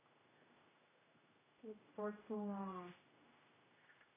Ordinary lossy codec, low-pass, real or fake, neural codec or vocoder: AAC, 16 kbps; 3.6 kHz; fake; codec, 16 kHz, 0.5 kbps, X-Codec, HuBERT features, trained on general audio